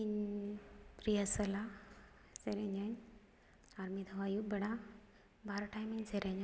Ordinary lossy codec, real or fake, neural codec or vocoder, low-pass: none; real; none; none